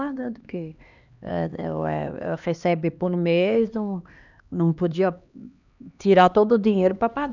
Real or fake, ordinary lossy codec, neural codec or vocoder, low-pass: fake; none; codec, 16 kHz, 2 kbps, X-Codec, HuBERT features, trained on LibriSpeech; 7.2 kHz